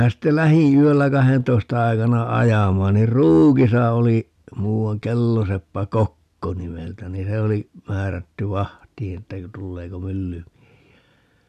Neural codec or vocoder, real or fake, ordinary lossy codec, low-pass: none; real; none; 14.4 kHz